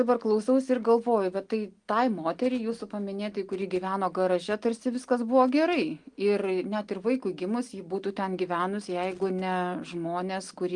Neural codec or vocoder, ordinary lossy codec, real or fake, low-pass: none; Opus, 24 kbps; real; 9.9 kHz